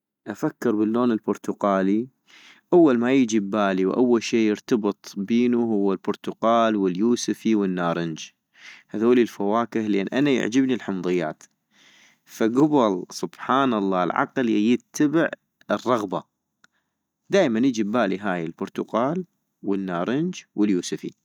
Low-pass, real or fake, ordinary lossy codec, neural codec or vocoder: 19.8 kHz; real; none; none